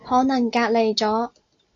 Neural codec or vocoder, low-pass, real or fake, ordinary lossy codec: none; 7.2 kHz; real; AAC, 64 kbps